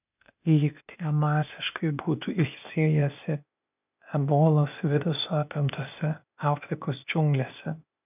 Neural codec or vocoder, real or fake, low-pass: codec, 16 kHz, 0.8 kbps, ZipCodec; fake; 3.6 kHz